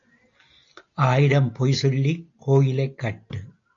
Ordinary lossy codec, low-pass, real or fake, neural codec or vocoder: AAC, 48 kbps; 7.2 kHz; real; none